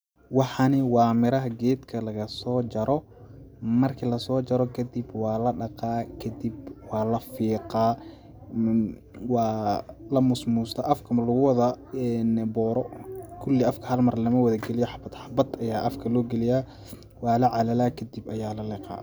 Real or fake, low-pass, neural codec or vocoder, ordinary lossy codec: real; none; none; none